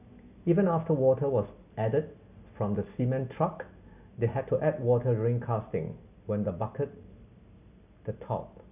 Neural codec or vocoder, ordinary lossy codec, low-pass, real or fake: none; none; 3.6 kHz; real